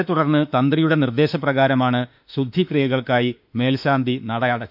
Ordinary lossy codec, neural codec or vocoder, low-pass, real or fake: none; autoencoder, 48 kHz, 32 numbers a frame, DAC-VAE, trained on Japanese speech; 5.4 kHz; fake